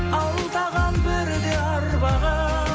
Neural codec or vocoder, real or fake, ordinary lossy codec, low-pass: none; real; none; none